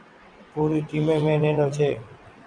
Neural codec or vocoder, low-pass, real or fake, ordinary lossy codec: vocoder, 22.05 kHz, 80 mel bands, WaveNeXt; 9.9 kHz; fake; MP3, 96 kbps